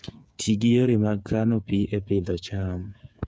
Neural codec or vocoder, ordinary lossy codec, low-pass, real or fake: codec, 16 kHz, 8 kbps, FreqCodec, smaller model; none; none; fake